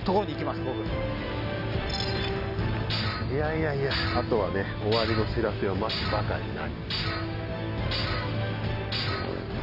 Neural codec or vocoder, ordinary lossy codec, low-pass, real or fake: none; AAC, 48 kbps; 5.4 kHz; real